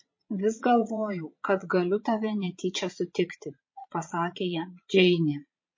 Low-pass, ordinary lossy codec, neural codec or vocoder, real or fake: 7.2 kHz; MP3, 32 kbps; vocoder, 22.05 kHz, 80 mel bands, Vocos; fake